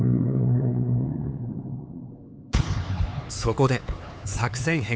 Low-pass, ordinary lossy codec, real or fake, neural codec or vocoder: none; none; fake; codec, 16 kHz, 4 kbps, X-Codec, HuBERT features, trained on LibriSpeech